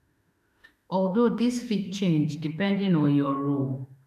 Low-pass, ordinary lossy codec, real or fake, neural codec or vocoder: 14.4 kHz; none; fake; autoencoder, 48 kHz, 32 numbers a frame, DAC-VAE, trained on Japanese speech